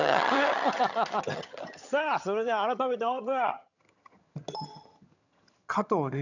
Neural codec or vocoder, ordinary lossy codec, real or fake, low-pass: vocoder, 22.05 kHz, 80 mel bands, HiFi-GAN; none; fake; 7.2 kHz